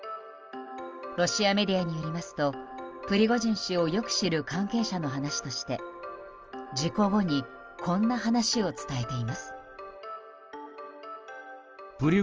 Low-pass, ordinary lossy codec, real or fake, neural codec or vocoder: 7.2 kHz; Opus, 24 kbps; real; none